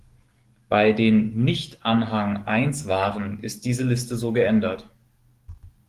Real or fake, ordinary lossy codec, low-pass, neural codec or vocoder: fake; Opus, 24 kbps; 14.4 kHz; codec, 44.1 kHz, 7.8 kbps, DAC